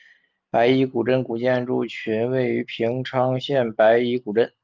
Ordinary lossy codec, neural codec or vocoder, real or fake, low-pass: Opus, 32 kbps; none; real; 7.2 kHz